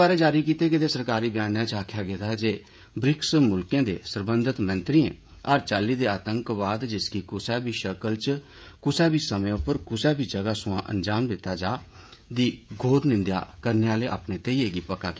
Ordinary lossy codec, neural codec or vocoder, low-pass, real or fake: none; codec, 16 kHz, 16 kbps, FreqCodec, smaller model; none; fake